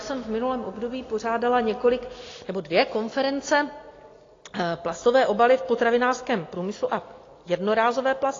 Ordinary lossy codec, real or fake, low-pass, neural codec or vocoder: AAC, 32 kbps; real; 7.2 kHz; none